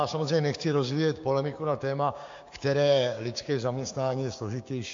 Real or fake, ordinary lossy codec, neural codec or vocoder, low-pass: fake; MP3, 48 kbps; codec, 16 kHz, 6 kbps, DAC; 7.2 kHz